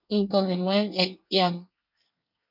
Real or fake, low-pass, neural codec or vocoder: fake; 5.4 kHz; codec, 24 kHz, 1 kbps, SNAC